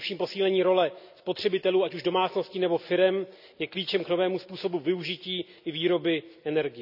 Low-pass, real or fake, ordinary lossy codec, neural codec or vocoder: 5.4 kHz; real; none; none